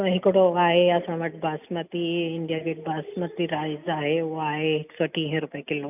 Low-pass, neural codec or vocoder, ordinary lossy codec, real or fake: 3.6 kHz; none; none; real